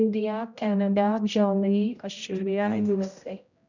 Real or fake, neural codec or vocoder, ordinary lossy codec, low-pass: fake; codec, 16 kHz, 0.5 kbps, X-Codec, HuBERT features, trained on general audio; none; 7.2 kHz